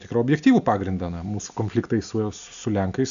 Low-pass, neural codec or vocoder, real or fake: 7.2 kHz; none; real